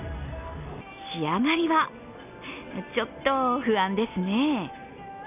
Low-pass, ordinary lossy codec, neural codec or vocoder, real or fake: 3.6 kHz; none; none; real